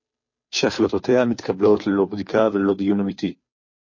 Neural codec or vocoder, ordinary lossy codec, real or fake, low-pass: codec, 16 kHz, 2 kbps, FunCodec, trained on Chinese and English, 25 frames a second; MP3, 32 kbps; fake; 7.2 kHz